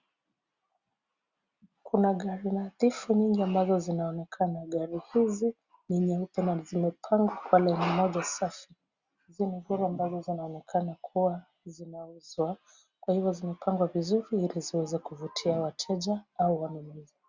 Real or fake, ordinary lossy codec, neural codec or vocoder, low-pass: real; Opus, 64 kbps; none; 7.2 kHz